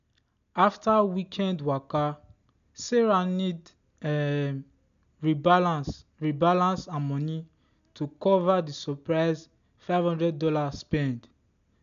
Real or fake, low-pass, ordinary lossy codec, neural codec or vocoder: real; 7.2 kHz; none; none